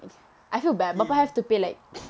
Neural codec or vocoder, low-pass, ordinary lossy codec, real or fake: none; none; none; real